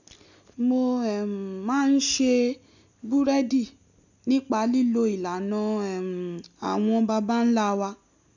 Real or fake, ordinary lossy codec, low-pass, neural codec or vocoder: real; none; 7.2 kHz; none